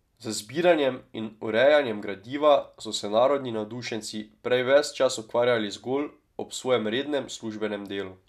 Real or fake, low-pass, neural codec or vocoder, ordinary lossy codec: real; 14.4 kHz; none; none